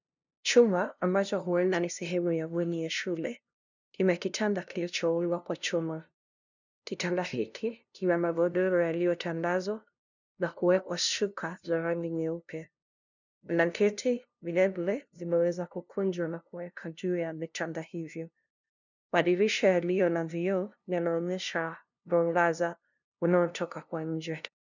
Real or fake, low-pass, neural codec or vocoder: fake; 7.2 kHz; codec, 16 kHz, 0.5 kbps, FunCodec, trained on LibriTTS, 25 frames a second